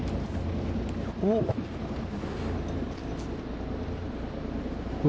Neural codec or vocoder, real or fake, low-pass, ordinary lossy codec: none; real; none; none